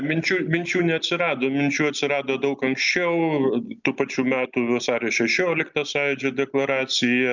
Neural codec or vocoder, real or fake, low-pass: none; real; 7.2 kHz